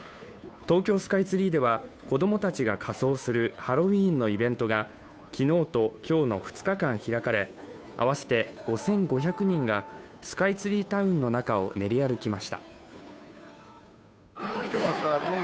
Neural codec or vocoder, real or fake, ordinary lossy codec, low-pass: codec, 16 kHz, 2 kbps, FunCodec, trained on Chinese and English, 25 frames a second; fake; none; none